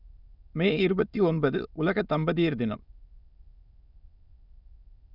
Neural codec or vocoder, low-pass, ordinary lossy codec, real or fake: autoencoder, 22.05 kHz, a latent of 192 numbers a frame, VITS, trained on many speakers; 5.4 kHz; none; fake